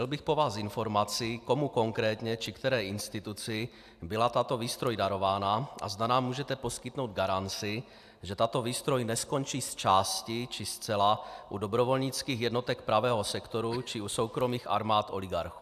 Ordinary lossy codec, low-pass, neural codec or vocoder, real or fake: AAC, 96 kbps; 14.4 kHz; none; real